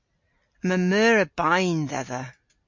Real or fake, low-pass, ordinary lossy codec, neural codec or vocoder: real; 7.2 kHz; MP3, 48 kbps; none